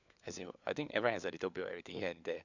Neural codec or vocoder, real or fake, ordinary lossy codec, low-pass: codec, 16 kHz, 4 kbps, FunCodec, trained on LibriTTS, 50 frames a second; fake; none; 7.2 kHz